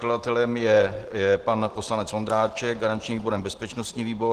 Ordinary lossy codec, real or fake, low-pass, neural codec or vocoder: Opus, 16 kbps; real; 14.4 kHz; none